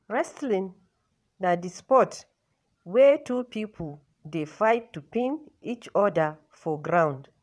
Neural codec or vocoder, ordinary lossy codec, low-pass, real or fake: vocoder, 22.05 kHz, 80 mel bands, WaveNeXt; none; none; fake